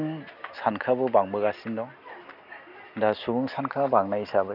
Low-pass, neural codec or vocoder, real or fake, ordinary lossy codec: 5.4 kHz; none; real; none